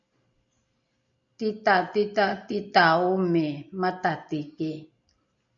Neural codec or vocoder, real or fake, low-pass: none; real; 7.2 kHz